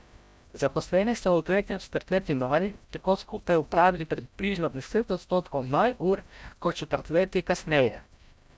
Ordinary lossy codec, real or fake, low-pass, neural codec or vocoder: none; fake; none; codec, 16 kHz, 0.5 kbps, FreqCodec, larger model